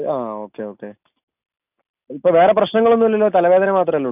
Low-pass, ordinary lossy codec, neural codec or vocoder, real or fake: 3.6 kHz; none; none; real